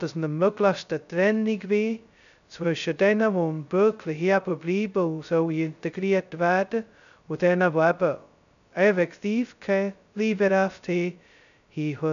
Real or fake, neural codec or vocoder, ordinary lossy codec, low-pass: fake; codec, 16 kHz, 0.2 kbps, FocalCodec; none; 7.2 kHz